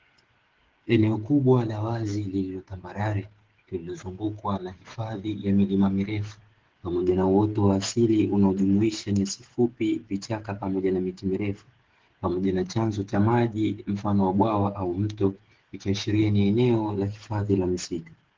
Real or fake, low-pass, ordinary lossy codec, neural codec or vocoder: fake; 7.2 kHz; Opus, 16 kbps; codec, 16 kHz, 8 kbps, FreqCodec, smaller model